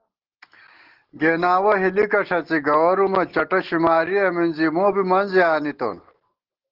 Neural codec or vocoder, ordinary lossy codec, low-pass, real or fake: none; Opus, 16 kbps; 5.4 kHz; real